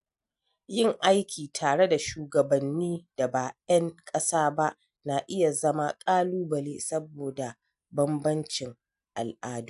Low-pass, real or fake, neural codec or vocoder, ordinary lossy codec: 10.8 kHz; real; none; AAC, 96 kbps